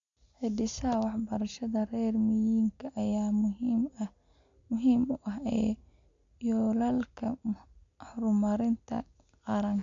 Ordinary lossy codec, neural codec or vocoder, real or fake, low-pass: MP3, 64 kbps; none; real; 7.2 kHz